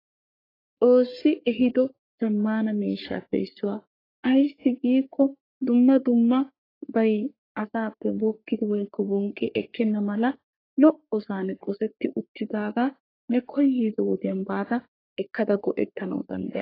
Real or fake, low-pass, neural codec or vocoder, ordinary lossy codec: fake; 5.4 kHz; codec, 44.1 kHz, 3.4 kbps, Pupu-Codec; AAC, 24 kbps